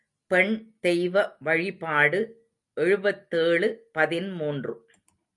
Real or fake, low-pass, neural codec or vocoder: real; 10.8 kHz; none